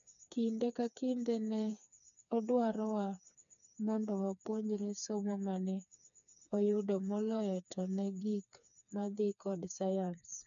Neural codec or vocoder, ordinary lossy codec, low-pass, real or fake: codec, 16 kHz, 4 kbps, FreqCodec, smaller model; none; 7.2 kHz; fake